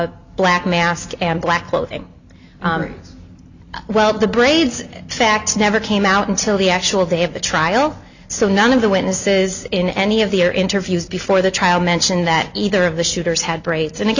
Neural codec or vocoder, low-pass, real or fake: none; 7.2 kHz; real